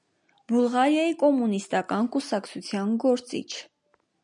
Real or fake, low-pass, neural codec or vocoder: real; 10.8 kHz; none